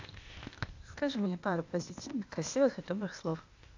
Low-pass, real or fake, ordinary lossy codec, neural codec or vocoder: 7.2 kHz; fake; AAC, 48 kbps; codec, 16 kHz, 0.8 kbps, ZipCodec